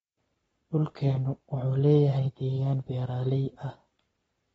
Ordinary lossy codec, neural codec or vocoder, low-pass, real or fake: AAC, 24 kbps; codec, 44.1 kHz, 7.8 kbps, Pupu-Codec; 19.8 kHz; fake